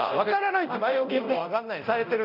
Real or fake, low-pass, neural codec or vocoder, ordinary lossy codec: fake; 5.4 kHz; codec, 24 kHz, 0.9 kbps, DualCodec; none